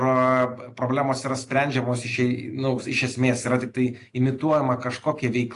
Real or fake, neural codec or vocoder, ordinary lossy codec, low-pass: real; none; AAC, 48 kbps; 10.8 kHz